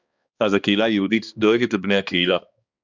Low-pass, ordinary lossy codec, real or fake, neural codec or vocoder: 7.2 kHz; Opus, 64 kbps; fake; codec, 16 kHz, 2 kbps, X-Codec, HuBERT features, trained on balanced general audio